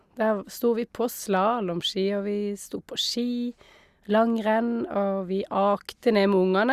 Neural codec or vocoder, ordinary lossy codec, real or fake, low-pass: none; Opus, 64 kbps; real; 14.4 kHz